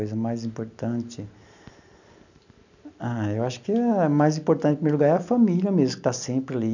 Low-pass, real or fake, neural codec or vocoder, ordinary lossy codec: 7.2 kHz; real; none; none